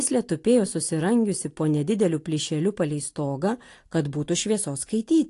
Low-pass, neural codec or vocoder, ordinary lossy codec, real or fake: 10.8 kHz; none; AAC, 48 kbps; real